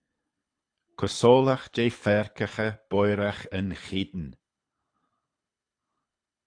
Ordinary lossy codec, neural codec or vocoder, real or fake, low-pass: AAC, 48 kbps; codec, 24 kHz, 6 kbps, HILCodec; fake; 9.9 kHz